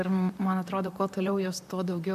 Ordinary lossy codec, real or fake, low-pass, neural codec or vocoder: AAC, 96 kbps; fake; 14.4 kHz; vocoder, 44.1 kHz, 128 mel bands, Pupu-Vocoder